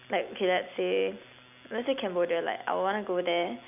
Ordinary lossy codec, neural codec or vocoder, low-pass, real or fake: none; none; 3.6 kHz; real